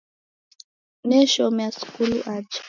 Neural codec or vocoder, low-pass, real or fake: none; 7.2 kHz; real